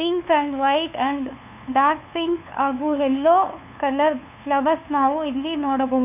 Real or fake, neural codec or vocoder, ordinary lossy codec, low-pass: fake; codec, 16 kHz, 2 kbps, FunCodec, trained on LibriTTS, 25 frames a second; none; 3.6 kHz